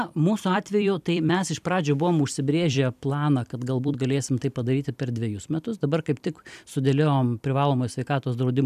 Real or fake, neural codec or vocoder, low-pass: fake; vocoder, 44.1 kHz, 128 mel bands every 256 samples, BigVGAN v2; 14.4 kHz